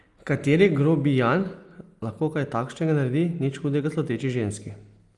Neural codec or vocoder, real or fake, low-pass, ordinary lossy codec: none; real; 10.8 kHz; Opus, 32 kbps